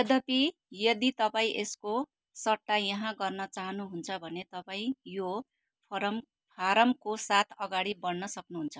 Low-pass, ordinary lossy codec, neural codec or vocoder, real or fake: none; none; none; real